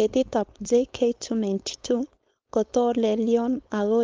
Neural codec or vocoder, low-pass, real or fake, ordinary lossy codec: codec, 16 kHz, 4.8 kbps, FACodec; 7.2 kHz; fake; Opus, 24 kbps